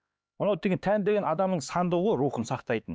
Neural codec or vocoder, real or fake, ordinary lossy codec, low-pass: codec, 16 kHz, 4 kbps, X-Codec, HuBERT features, trained on LibriSpeech; fake; none; none